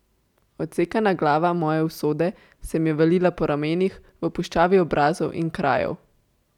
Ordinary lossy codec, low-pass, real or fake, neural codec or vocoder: none; 19.8 kHz; real; none